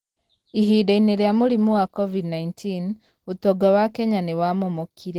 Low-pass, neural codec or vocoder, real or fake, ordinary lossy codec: 19.8 kHz; none; real; Opus, 16 kbps